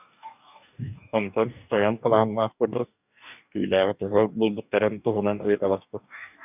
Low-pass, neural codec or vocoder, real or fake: 3.6 kHz; codec, 44.1 kHz, 2.6 kbps, DAC; fake